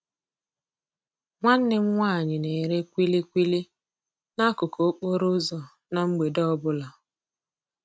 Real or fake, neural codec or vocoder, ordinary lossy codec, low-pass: real; none; none; none